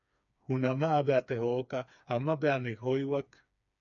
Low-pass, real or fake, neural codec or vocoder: 7.2 kHz; fake; codec, 16 kHz, 4 kbps, FreqCodec, smaller model